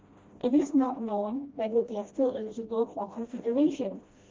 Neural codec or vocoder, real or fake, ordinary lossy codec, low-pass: codec, 16 kHz, 1 kbps, FreqCodec, smaller model; fake; Opus, 32 kbps; 7.2 kHz